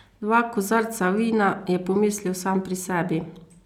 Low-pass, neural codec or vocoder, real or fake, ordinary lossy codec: 19.8 kHz; none; real; none